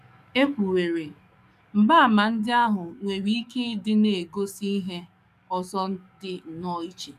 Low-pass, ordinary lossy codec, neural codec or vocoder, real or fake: 14.4 kHz; none; codec, 44.1 kHz, 7.8 kbps, DAC; fake